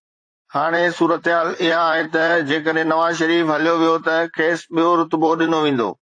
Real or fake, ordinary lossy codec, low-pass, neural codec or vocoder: fake; AAC, 48 kbps; 9.9 kHz; vocoder, 44.1 kHz, 128 mel bands, Pupu-Vocoder